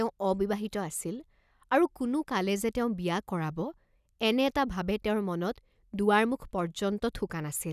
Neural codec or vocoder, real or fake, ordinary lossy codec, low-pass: none; real; none; 14.4 kHz